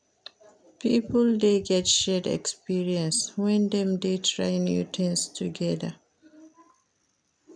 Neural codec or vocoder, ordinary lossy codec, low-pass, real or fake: none; none; 9.9 kHz; real